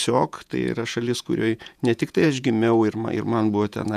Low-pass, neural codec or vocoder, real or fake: 14.4 kHz; vocoder, 48 kHz, 128 mel bands, Vocos; fake